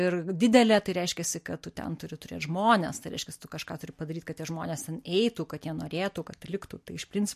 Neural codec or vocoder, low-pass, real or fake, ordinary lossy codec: none; 14.4 kHz; real; MP3, 64 kbps